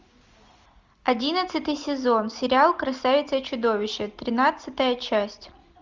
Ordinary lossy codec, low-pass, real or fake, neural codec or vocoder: Opus, 32 kbps; 7.2 kHz; real; none